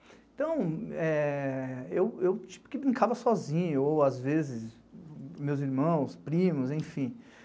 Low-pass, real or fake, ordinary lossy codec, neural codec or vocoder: none; real; none; none